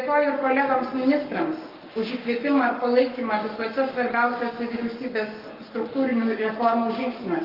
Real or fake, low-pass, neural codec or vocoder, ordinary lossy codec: fake; 5.4 kHz; codec, 44.1 kHz, 7.8 kbps, Pupu-Codec; Opus, 32 kbps